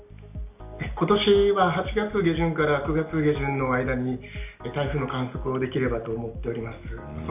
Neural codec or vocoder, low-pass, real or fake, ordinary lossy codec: none; 3.6 kHz; real; none